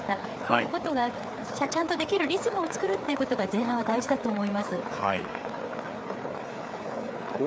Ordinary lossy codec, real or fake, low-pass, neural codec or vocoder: none; fake; none; codec, 16 kHz, 4 kbps, FreqCodec, larger model